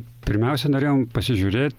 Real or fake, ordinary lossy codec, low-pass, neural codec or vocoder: real; Opus, 32 kbps; 19.8 kHz; none